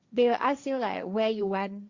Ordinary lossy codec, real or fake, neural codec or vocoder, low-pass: Opus, 64 kbps; fake; codec, 16 kHz, 1.1 kbps, Voila-Tokenizer; 7.2 kHz